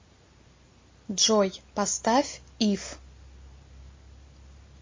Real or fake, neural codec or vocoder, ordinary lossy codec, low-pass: real; none; MP3, 32 kbps; 7.2 kHz